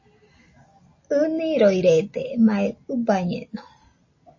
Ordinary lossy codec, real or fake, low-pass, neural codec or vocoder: MP3, 32 kbps; real; 7.2 kHz; none